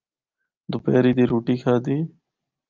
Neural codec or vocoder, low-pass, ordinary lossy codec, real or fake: none; 7.2 kHz; Opus, 24 kbps; real